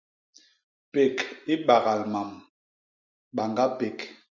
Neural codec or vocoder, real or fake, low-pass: none; real; 7.2 kHz